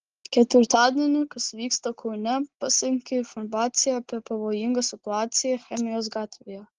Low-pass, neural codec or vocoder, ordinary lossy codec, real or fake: 7.2 kHz; none; Opus, 16 kbps; real